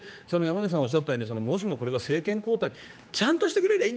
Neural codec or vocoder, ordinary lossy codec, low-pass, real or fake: codec, 16 kHz, 2 kbps, X-Codec, HuBERT features, trained on general audio; none; none; fake